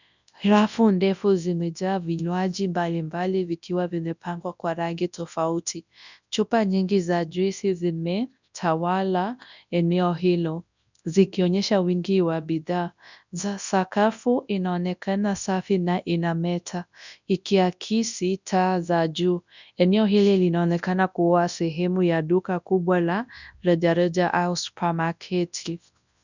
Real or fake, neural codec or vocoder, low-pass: fake; codec, 24 kHz, 0.9 kbps, WavTokenizer, large speech release; 7.2 kHz